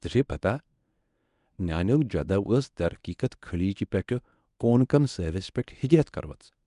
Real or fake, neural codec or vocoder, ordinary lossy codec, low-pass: fake; codec, 24 kHz, 0.9 kbps, WavTokenizer, medium speech release version 1; none; 10.8 kHz